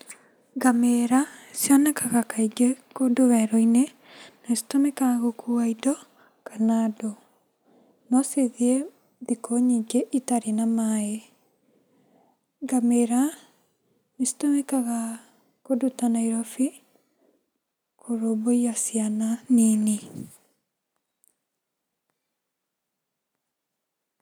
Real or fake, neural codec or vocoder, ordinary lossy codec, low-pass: real; none; none; none